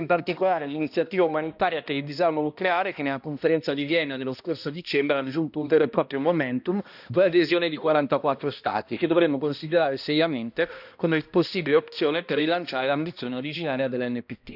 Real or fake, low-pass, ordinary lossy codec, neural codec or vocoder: fake; 5.4 kHz; none; codec, 16 kHz, 1 kbps, X-Codec, HuBERT features, trained on balanced general audio